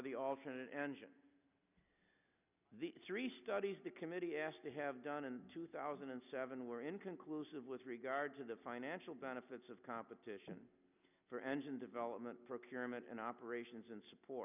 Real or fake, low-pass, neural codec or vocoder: real; 3.6 kHz; none